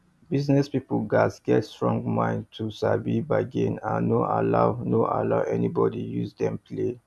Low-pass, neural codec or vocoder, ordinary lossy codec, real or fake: none; none; none; real